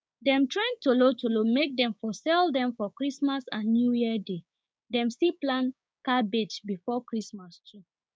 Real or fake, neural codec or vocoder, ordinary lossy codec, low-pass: fake; codec, 16 kHz, 6 kbps, DAC; none; none